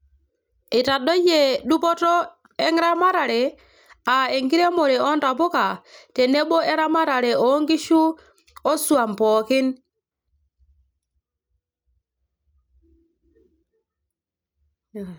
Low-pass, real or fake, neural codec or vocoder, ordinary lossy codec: none; real; none; none